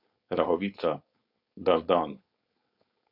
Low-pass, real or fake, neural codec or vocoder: 5.4 kHz; fake; codec, 16 kHz, 4.8 kbps, FACodec